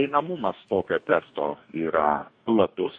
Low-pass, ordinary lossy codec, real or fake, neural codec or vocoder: 9.9 kHz; MP3, 48 kbps; fake; codec, 44.1 kHz, 2.6 kbps, DAC